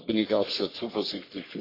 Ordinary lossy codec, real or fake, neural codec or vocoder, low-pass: AAC, 24 kbps; fake; codec, 44.1 kHz, 3.4 kbps, Pupu-Codec; 5.4 kHz